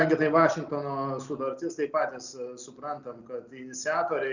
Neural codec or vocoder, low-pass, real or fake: none; 7.2 kHz; real